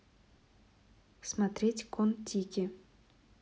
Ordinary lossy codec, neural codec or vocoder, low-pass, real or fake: none; none; none; real